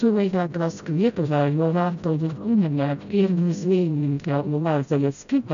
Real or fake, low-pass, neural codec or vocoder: fake; 7.2 kHz; codec, 16 kHz, 0.5 kbps, FreqCodec, smaller model